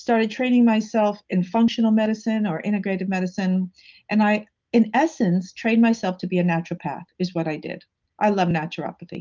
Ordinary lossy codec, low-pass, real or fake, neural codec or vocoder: Opus, 32 kbps; 7.2 kHz; real; none